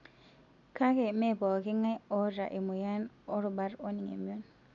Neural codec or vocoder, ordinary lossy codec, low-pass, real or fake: none; none; 7.2 kHz; real